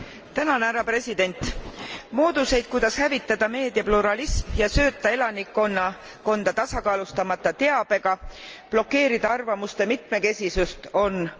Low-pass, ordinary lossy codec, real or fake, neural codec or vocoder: 7.2 kHz; Opus, 24 kbps; real; none